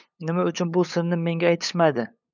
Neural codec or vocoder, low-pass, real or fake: codec, 16 kHz, 8 kbps, FunCodec, trained on LibriTTS, 25 frames a second; 7.2 kHz; fake